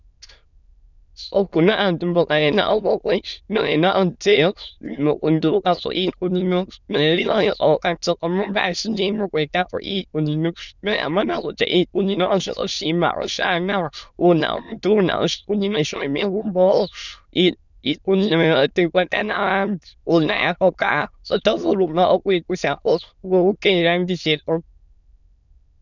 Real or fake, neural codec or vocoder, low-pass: fake; autoencoder, 22.05 kHz, a latent of 192 numbers a frame, VITS, trained on many speakers; 7.2 kHz